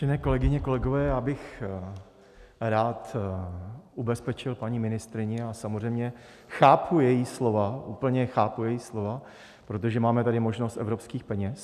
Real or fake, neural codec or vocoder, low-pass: real; none; 14.4 kHz